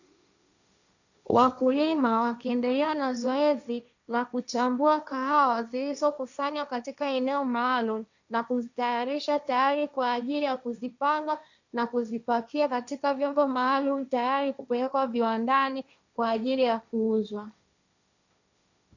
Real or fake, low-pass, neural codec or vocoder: fake; 7.2 kHz; codec, 16 kHz, 1.1 kbps, Voila-Tokenizer